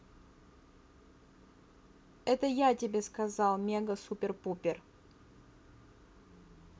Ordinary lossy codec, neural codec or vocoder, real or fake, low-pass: none; none; real; none